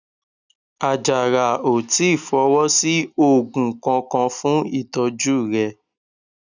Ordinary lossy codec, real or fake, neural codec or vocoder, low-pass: none; real; none; 7.2 kHz